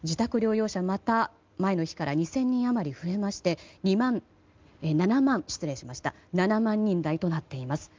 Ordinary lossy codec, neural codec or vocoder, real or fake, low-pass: Opus, 32 kbps; none; real; 7.2 kHz